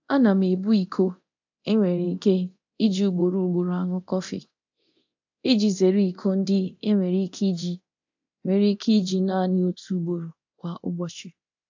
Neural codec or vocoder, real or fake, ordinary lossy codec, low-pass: codec, 24 kHz, 0.9 kbps, DualCodec; fake; AAC, 48 kbps; 7.2 kHz